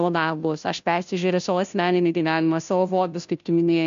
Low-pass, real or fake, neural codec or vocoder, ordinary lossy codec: 7.2 kHz; fake; codec, 16 kHz, 0.5 kbps, FunCodec, trained on Chinese and English, 25 frames a second; MP3, 64 kbps